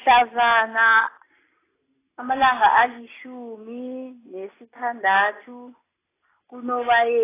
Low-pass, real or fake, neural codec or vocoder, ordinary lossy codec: 3.6 kHz; real; none; AAC, 16 kbps